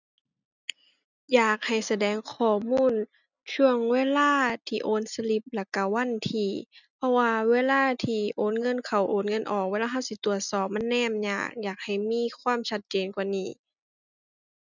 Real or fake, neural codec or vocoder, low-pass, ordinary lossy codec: real; none; 7.2 kHz; none